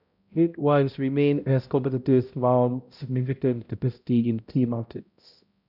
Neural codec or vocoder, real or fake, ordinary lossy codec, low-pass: codec, 16 kHz, 0.5 kbps, X-Codec, HuBERT features, trained on balanced general audio; fake; none; 5.4 kHz